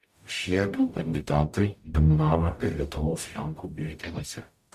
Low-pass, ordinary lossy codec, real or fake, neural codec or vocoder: 14.4 kHz; Opus, 64 kbps; fake; codec, 44.1 kHz, 0.9 kbps, DAC